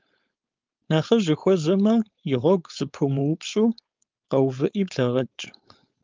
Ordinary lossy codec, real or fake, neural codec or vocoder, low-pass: Opus, 24 kbps; fake; codec, 16 kHz, 4.8 kbps, FACodec; 7.2 kHz